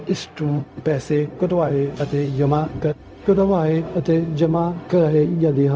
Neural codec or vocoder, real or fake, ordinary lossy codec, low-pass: codec, 16 kHz, 0.4 kbps, LongCat-Audio-Codec; fake; none; none